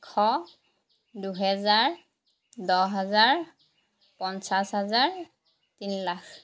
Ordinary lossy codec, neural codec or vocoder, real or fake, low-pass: none; none; real; none